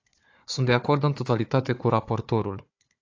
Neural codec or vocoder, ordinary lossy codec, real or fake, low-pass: codec, 16 kHz, 4 kbps, FunCodec, trained on Chinese and English, 50 frames a second; AAC, 48 kbps; fake; 7.2 kHz